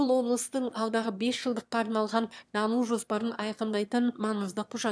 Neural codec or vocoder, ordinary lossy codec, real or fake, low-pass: autoencoder, 22.05 kHz, a latent of 192 numbers a frame, VITS, trained on one speaker; none; fake; none